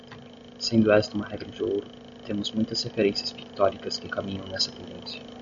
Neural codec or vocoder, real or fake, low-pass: none; real; 7.2 kHz